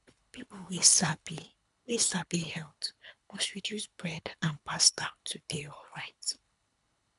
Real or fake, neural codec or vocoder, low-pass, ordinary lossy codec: fake; codec, 24 kHz, 3 kbps, HILCodec; 10.8 kHz; none